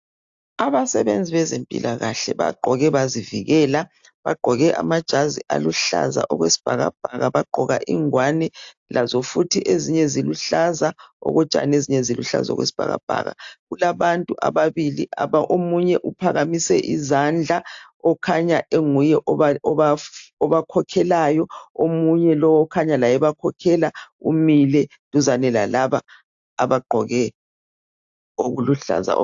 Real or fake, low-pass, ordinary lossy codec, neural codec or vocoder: real; 7.2 kHz; AAC, 64 kbps; none